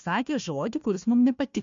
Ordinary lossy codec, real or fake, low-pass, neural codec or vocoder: MP3, 48 kbps; fake; 7.2 kHz; codec, 16 kHz, 1 kbps, FunCodec, trained on Chinese and English, 50 frames a second